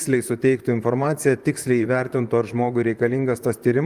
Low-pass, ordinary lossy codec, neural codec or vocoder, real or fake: 14.4 kHz; Opus, 32 kbps; vocoder, 44.1 kHz, 128 mel bands, Pupu-Vocoder; fake